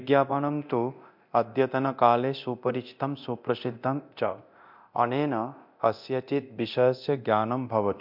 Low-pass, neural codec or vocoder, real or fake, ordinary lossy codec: 5.4 kHz; codec, 24 kHz, 0.9 kbps, DualCodec; fake; MP3, 48 kbps